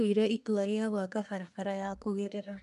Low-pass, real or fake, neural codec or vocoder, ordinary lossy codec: 10.8 kHz; fake; codec, 24 kHz, 1 kbps, SNAC; none